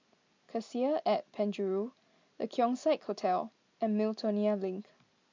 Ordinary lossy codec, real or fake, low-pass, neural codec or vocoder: MP3, 64 kbps; real; 7.2 kHz; none